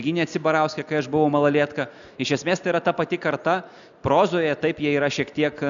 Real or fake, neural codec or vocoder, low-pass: real; none; 7.2 kHz